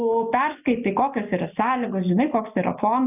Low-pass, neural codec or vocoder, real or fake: 3.6 kHz; none; real